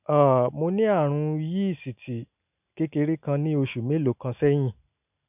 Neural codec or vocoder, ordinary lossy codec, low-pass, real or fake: none; none; 3.6 kHz; real